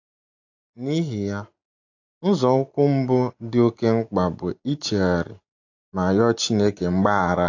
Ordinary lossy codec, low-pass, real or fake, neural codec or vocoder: AAC, 48 kbps; 7.2 kHz; real; none